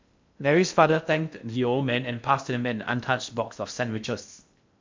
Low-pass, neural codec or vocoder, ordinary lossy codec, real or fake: 7.2 kHz; codec, 16 kHz in and 24 kHz out, 0.6 kbps, FocalCodec, streaming, 2048 codes; MP3, 64 kbps; fake